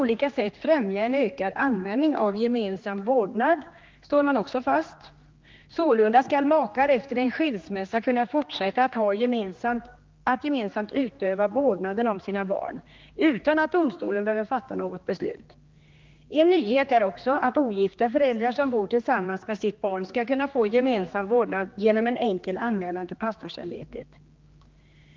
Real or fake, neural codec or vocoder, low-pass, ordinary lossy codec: fake; codec, 16 kHz, 2 kbps, X-Codec, HuBERT features, trained on general audio; 7.2 kHz; Opus, 32 kbps